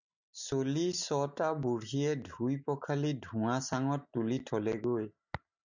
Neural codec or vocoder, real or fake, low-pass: none; real; 7.2 kHz